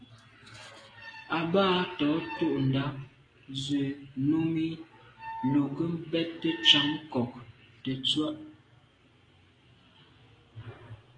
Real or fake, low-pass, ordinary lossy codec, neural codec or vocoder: real; 9.9 kHz; AAC, 32 kbps; none